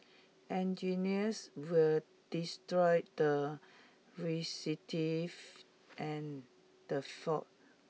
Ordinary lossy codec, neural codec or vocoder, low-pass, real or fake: none; none; none; real